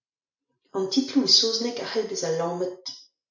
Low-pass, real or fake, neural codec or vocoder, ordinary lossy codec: 7.2 kHz; real; none; AAC, 48 kbps